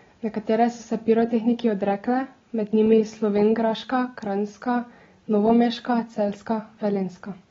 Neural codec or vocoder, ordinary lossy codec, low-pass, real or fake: none; AAC, 24 kbps; 7.2 kHz; real